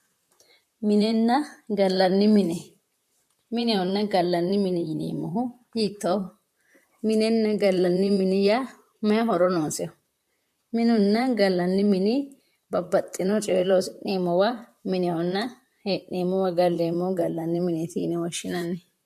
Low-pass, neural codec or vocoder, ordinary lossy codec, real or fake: 14.4 kHz; vocoder, 44.1 kHz, 128 mel bands, Pupu-Vocoder; MP3, 64 kbps; fake